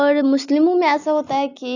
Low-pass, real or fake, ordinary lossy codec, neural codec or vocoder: 7.2 kHz; real; none; none